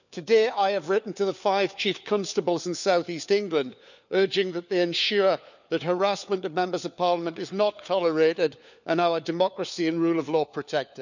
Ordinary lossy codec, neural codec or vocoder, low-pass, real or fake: none; codec, 16 kHz, 4 kbps, FunCodec, trained on LibriTTS, 50 frames a second; 7.2 kHz; fake